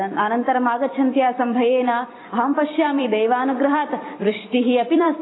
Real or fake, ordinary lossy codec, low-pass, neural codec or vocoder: fake; AAC, 16 kbps; 7.2 kHz; vocoder, 44.1 kHz, 128 mel bands every 256 samples, BigVGAN v2